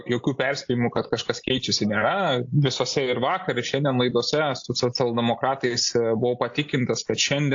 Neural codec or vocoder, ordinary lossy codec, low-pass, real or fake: none; AAC, 48 kbps; 7.2 kHz; real